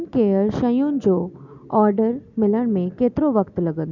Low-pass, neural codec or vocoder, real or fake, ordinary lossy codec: 7.2 kHz; none; real; none